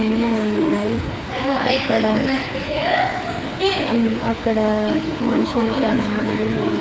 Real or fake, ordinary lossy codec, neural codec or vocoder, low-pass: fake; none; codec, 16 kHz, 4 kbps, FreqCodec, larger model; none